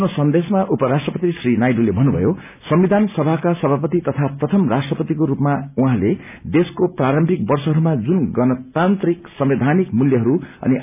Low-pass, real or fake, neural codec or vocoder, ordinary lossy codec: 3.6 kHz; real; none; none